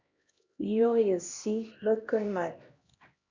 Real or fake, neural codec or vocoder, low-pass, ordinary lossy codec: fake; codec, 16 kHz, 1 kbps, X-Codec, HuBERT features, trained on LibriSpeech; 7.2 kHz; Opus, 64 kbps